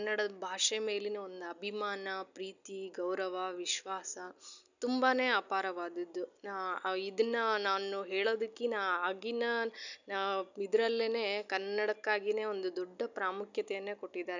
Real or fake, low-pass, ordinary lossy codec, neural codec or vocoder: real; 7.2 kHz; none; none